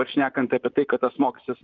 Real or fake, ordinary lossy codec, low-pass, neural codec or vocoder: fake; Opus, 32 kbps; 7.2 kHz; vocoder, 44.1 kHz, 128 mel bands, Pupu-Vocoder